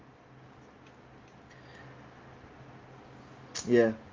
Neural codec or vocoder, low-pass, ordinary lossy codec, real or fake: none; 7.2 kHz; Opus, 32 kbps; real